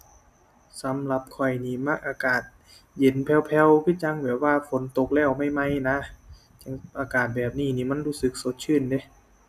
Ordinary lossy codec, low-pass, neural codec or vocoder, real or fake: none; 14.4 kHz; none; real